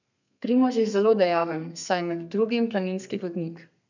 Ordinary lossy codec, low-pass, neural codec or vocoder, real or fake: none; 7.2 kHz; codec, 32 kHz, 1.9 kbps, SNAC; fake